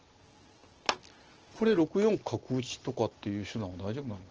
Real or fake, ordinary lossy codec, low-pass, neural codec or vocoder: real; Opus, 16 kbps; 7.2 kHz; none